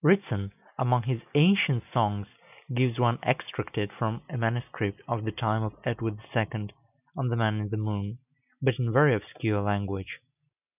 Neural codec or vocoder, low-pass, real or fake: none; 3.6 kHz; real